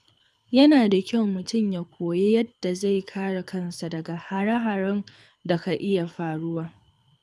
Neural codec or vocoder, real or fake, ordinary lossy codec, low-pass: codec, 24 kHz, 6 kbps, HILCodec; fake; none; none